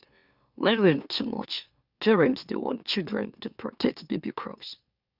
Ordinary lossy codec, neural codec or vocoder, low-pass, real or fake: Opus, 64 kbps; autoencoder, 44.1 kHz, a latent of 192 numbers a frame, MeloTTS; 5.4 kHz; fake